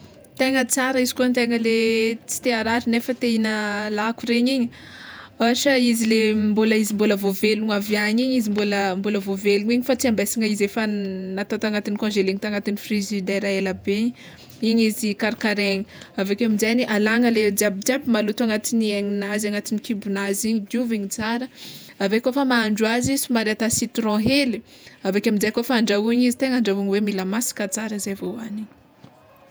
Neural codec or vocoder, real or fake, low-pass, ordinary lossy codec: vocoder, 48 kHz, 128 mel bands, Vocos; fake; none; none